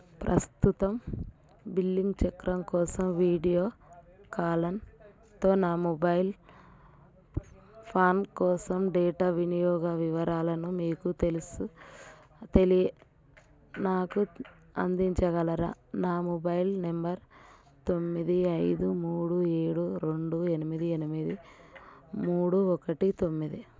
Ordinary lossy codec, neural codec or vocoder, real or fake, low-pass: none; none; real; none